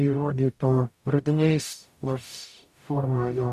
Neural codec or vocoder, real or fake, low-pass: codec, 44.1 kHz, 0.9 kbps, DAC; fake; 14.4 kHz